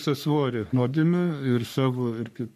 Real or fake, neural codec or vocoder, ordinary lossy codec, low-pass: fake; codec, 44.1 kHz, 3.4 kbps, Pupu-Codec; MP3, 96 kbps; 14.4 kHz